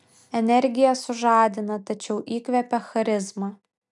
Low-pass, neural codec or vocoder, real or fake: 10.8 kHz; none; real